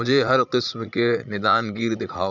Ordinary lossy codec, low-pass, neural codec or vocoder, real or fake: none; 7.2 kHz; vocoder, 44.1 kHz, 80 mel bands, Vocos; fake